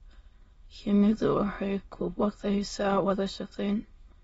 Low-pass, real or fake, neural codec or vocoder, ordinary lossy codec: 9.9 kHz; fake; autoencoder, 22.05 kHz, a latent of 192 numbers a frame, VITS, trained on many speakers; AAC, 24 kbps